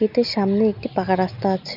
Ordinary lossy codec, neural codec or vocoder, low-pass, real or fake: none; none; 5.4 kHz; real